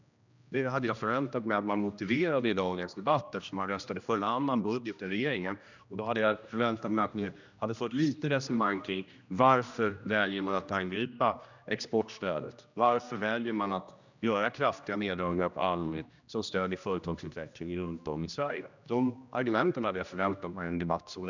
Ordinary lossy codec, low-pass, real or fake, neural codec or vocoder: none; 7.2 kHz; fake; codec, 16 kHz, 1 kbps, X-Codec, HuBERT features, trained on general audio